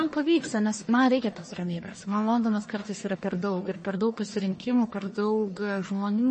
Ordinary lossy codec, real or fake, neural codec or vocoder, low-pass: MP3, 32 kbps; fake; codec, 44.1 kHz, 1.7 kbps, Pupu-Codec; 10.8 kHz